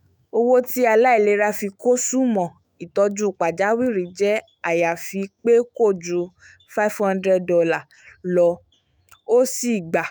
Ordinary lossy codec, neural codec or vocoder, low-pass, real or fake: none; autoencoder, 48 kHz, 128 numbers a frame, DAC-VAE, trained on Japanese speech; none; fake